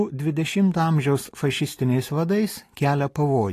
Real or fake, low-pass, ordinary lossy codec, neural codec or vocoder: real; 14.4 kHz; AAC, 48 kbps; none